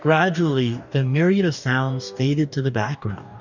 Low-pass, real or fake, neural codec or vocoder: 7.2 kHz; fake; codec, 44.1 kHz, 2.6 kbps, DAC